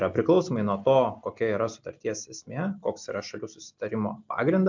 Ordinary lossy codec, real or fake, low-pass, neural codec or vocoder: MP3, 64 kbps; real; 7.2 kHz; none